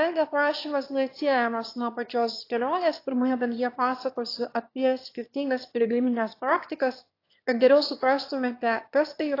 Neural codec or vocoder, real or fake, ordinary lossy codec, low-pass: autoencoder, 22.05 kHz, a latent of 192 numbers a frame, VITS, trained on one speaker; fake; AAC, 32 kbps; 5.4 kHz